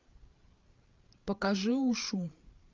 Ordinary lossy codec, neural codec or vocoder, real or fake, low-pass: Opus, 24 kbps; codec, 16 kHz, 8 kbps, FreqCodec, larger model; fake; 7.2 kHz